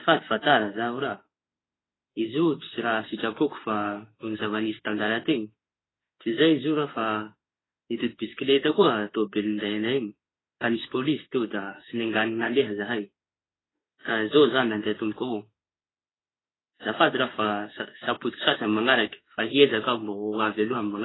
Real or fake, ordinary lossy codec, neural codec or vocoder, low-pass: fake; AAC, 16 kbps; autoencoder, 48 kHz, 32 numbers a frame, DAC-VAE, trained on Japanese speech; 7.2 kHz